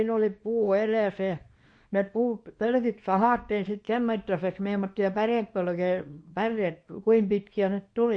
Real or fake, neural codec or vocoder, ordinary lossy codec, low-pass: fake; codec, 24 kHz, 0.9 kbps, WavTokenizer, small release; MP3, 48 kbps; 9.9 kHz